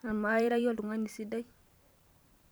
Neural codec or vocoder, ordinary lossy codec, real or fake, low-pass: vocoder, 44.1 kHz, 128 mel bands every 512 samples, BigVGAN v2; none; fake; none